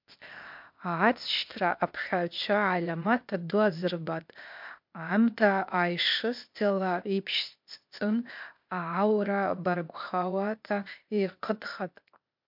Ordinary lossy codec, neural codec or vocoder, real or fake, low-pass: MP3, 48 kbps; codec, 16 kHz, 0.8 kbps, ZipCodec; fake; 5.4 kHz